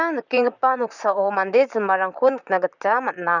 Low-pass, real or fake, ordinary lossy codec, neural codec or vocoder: 7.2 kHz; fake; none; vocoder, 44.1 kHz, 128 mel bands, Pupu-Vocoder